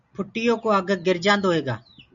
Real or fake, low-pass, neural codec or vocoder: real; 7.2 kHz; none